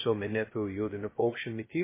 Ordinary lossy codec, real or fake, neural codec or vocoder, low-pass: MP3, 16 kbps; fake; codec, 16 kHz, 0.2 kbps, FocalCodec; 3.6 kHz